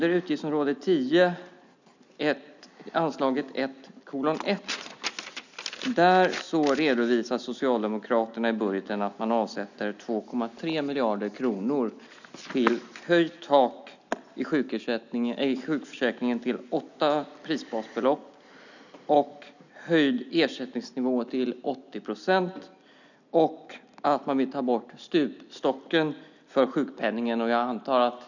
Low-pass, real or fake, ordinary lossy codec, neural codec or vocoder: 7.2 kHz; real; none; none